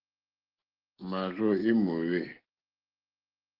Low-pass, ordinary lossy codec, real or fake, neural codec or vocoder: 5.4 kHz; Opus, 16 kbps; real; none